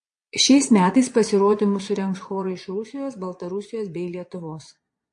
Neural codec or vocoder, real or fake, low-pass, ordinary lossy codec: vocoder, 22.05 kHz, 80 mel bands, Vocos; fake; 9.9 kHz; MP3, 48 kbps